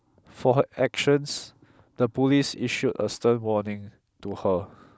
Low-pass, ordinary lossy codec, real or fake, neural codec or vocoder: none; none; real; none